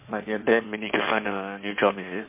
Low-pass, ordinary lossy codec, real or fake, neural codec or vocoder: 3.6 kHz; MP3, 24 kbps; fake; codec, 16 kHz in and 24 kHz out, 2.2 kbps, FireRedTTS-2 codec